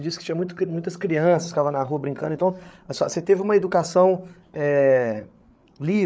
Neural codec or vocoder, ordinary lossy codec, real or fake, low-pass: codec, 16 kHz, 8 kbps, FreqCodec, larger model; none; fake; none